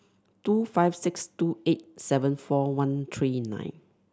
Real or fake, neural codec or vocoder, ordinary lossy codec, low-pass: real; none; none; none